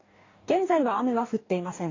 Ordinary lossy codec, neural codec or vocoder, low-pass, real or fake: AAC, 32 kbps; codec, 44.1 kHz, 2.6 kbps, DAC; 7.2 kHz; fake